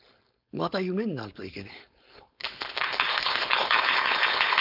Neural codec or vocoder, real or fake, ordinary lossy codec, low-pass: codec, 16 kHz, 4.8 kbps, FACodec; fake; none; 5.4 kHz